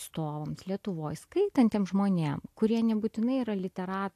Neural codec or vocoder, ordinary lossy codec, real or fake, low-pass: none; AAC, 64 kbps; real; 14.4 kHz